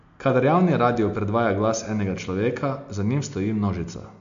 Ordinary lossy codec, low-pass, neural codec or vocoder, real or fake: AAC, 64 kbps; 7.2 kHz; none; real